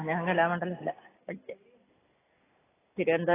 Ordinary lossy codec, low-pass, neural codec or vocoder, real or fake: AAC, 16 kbps; 3.6 kHz; none; real